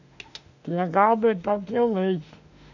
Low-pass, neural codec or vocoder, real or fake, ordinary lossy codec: 7.2 kHz; autoencoder, 48 kHz, 32 numbers a frame, DAC-VAE, trained on Japanese speech; fake; none